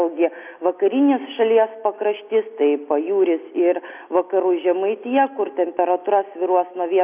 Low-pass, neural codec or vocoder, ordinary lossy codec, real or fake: 3.6 kHz; none; AAC, 32 kbps; real